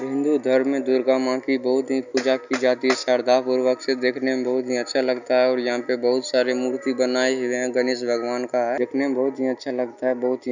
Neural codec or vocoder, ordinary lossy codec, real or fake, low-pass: none; none; real; 7.2 kHz